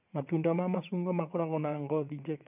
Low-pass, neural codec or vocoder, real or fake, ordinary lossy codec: 3.6 kHz; vocoder, 22.05 kHz, 80 mel bands, WaveNeXt; fake; none